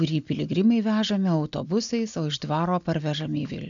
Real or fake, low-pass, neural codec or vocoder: real; 7.2 kHz; none